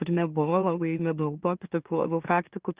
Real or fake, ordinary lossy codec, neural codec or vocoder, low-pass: fake; Opus, 32 kbps; autoencoder, 44.1 kHz, a latent of 192 numbers a frame, MeloTTS; 3.6 kHz